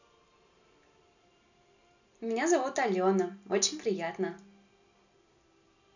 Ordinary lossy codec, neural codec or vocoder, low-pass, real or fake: none; none; 7.2 kHz; real